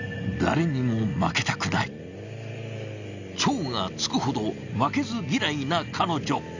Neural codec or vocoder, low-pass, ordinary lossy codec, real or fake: none; 7.2 kHz; none; real